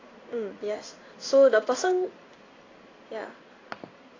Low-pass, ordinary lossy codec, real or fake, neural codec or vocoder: 7.2 kHz; AAC, 32 kbps; fake; codec, 16 kHz in and 24 kHz out, 1 kbps, XY-Tokenizer